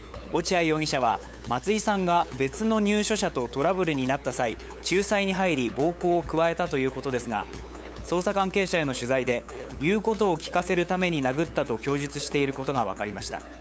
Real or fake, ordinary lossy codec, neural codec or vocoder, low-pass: fake; none; codec, 16 kHz, 8 kbps, FunCodec, trained on LibriTTS, 25 frames a second; none